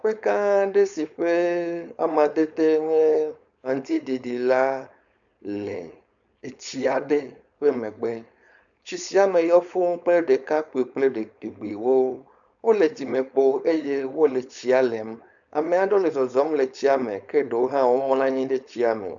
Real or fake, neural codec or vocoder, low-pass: fake; codec, 16 kHz, 4.8 kbps, FACodec; 7.2 kHz